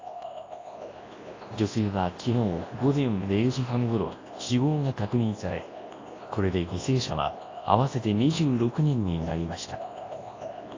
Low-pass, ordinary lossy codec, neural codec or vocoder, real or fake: 7.2 kHz; AAC, 32 kbps; codec, 24 kHz, 0.9 kbps, WavTokenizer, large speech release; fake